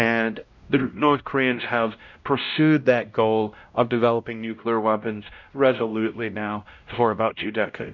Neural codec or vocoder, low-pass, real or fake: codec, 16 kHz, 0.5 kbps, X-Codec, WavLM features, trained on Multilingual LibriSpeech; 7.2 kHz; fake